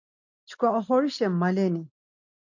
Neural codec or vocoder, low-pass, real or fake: none; 7.2 kHz; real